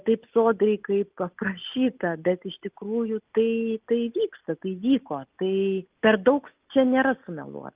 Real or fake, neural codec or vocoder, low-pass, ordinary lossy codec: real; none; 3.6 kHz; Opus, 24 kbps